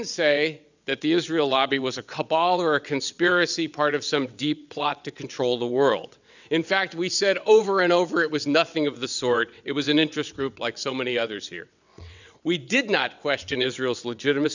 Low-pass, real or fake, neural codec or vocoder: 7.2 kHz; fake; vocoder, 22.05 kHz, 80 mel bands, WaveNeXt